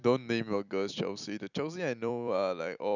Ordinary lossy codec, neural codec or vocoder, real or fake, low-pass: none; vocoder, 44.1 kHz, 128 mel bands every 256 samples, BigVGAN v2; fake; 7.2 kHz